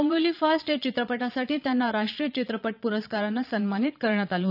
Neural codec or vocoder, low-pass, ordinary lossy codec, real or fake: vocoder, 22.05 kHz, 80 mel bands, Vocos; 5.4 kHz; none; fake